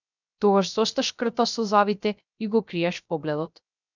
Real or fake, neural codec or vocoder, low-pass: fake; codec, 16 kHz, 0.3 kbps, FocalCodec; 7.2 kHz